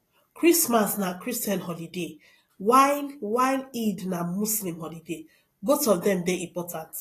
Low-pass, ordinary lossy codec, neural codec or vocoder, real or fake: 14.4 kHz; AAC, 48 kbps; none; real